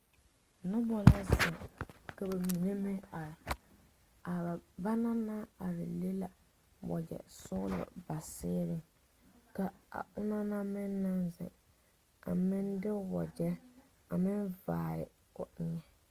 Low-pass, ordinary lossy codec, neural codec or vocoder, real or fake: 14.4 kHz; Opus, 32 kbps; none; real